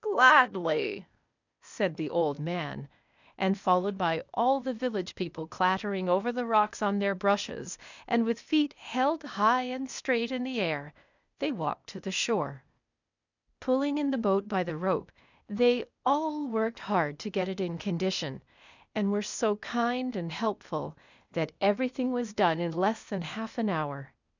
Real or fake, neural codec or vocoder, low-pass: fake; codec, 16 kHz, 0.8 kbps, ZipCodec; 7.2 kHz